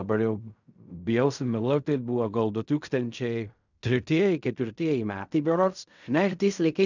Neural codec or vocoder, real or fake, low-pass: codec, 16 kHz in and 24 kHz out, 0.4 kbps, LongCat-Audio-Codec, fine tuned four codebook decoder; fake; 7.2 kHz